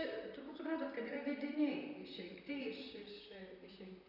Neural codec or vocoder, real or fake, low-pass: vocoder, 44.1 kHz, 128 mel bands, Pupu-Vocoder; fake; 5.4 kHz